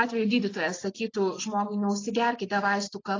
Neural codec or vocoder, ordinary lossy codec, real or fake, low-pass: none; AAC, 32 kbps; real; 7.2 kHz